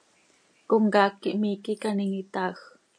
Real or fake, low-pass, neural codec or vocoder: real; 9.9 kHz; none